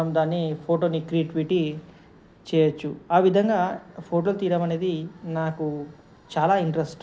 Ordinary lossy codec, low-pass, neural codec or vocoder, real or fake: none; none; none; real